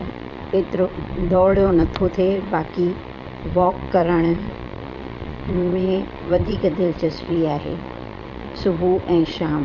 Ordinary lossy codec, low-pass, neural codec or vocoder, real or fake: none; 7.2 kHz; vocoder, 22.05 kHz, 80 mel bands, Vocos; fake